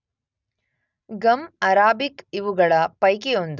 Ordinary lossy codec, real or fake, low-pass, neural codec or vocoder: none; real; 7.2 kHz; none